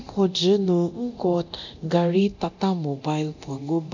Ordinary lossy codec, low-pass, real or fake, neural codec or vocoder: none; 7.2 kHz; fake; codec, 24 kHz, 0.9 kbps, DualCodec